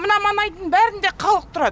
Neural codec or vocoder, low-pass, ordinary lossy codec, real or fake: none; none; none; real